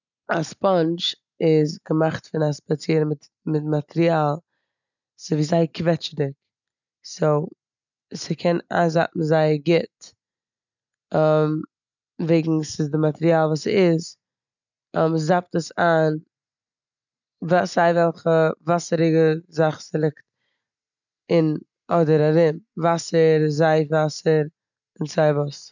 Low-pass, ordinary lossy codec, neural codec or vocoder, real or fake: 7.2 kHz; none; none; real